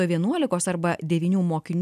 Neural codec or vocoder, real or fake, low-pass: none; real; 14.4 kHz